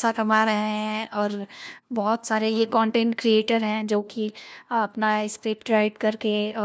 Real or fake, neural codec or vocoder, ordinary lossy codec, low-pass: fake; codec, 16 kHz, 1 kbps, FunCodec, trained on LibriTTS, 50 frames a second; none; none